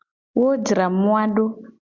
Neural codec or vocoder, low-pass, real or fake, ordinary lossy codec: none; 7.2 kHz; real; Opus, 64 kbps